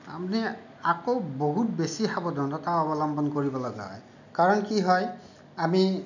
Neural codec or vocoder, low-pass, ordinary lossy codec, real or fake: none; 7.2 kHz; none; real